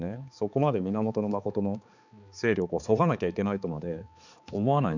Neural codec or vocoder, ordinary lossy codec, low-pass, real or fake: codec, 16 kHz, 4 kbps, X-Codec, HuBERT features, trained on balanced general audio; none; 7.2 kHz; fake